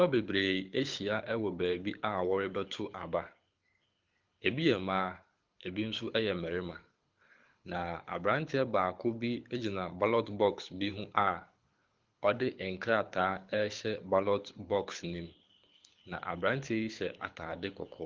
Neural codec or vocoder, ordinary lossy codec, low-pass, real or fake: codec, 24 kHz, 6 kbps, HILCodec; Opus, 32 kbps; 7.2 kHz; fake